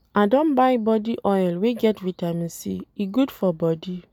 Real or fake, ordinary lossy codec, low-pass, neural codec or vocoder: real; none; 19.8 kHz; none